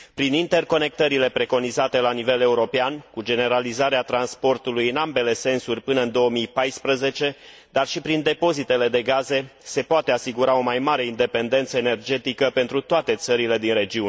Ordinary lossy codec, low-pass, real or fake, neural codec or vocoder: none; none; real; none